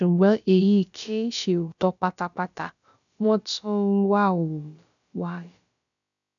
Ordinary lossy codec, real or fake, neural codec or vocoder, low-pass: none; fake; codec, 16 kHz, about 1 kbps, DyCAST, with the encoder's durations; 7.2 kHz